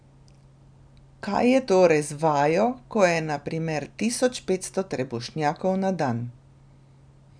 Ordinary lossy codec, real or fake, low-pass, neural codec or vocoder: none; real; 9.9 kHz; none